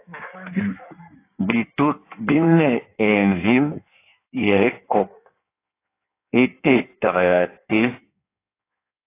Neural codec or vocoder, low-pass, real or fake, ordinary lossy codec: codec, 16 kHz in and 24 kHz out, 1.1 kbps, FireRedTTS-2 codec; 3.6 kHz; fake; AAC, 24 kbps